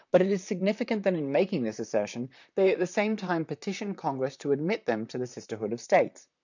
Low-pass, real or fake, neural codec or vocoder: 7.2 kHz; fake; vocoder, 44.1 kHz, 128 mel bands, Pupu-Vocoder